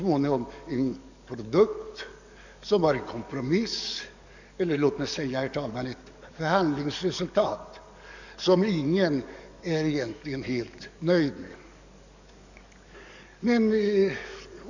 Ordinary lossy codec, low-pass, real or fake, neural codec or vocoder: none; 7.2 kHz; fake; codec, 44.1 kHz, 7.8 kbps, DAC